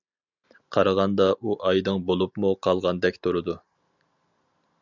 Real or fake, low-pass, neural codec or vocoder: real; 7.2 kHz; none